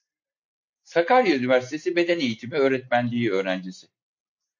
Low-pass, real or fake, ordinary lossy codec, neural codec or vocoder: 7.2 kHz; real; MP3, 48 kbps; none